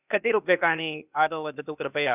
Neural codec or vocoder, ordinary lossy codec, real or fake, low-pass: codec, 16 kHz, 0.8 kbps, ZipCodec; none; fake; 3.6 kHz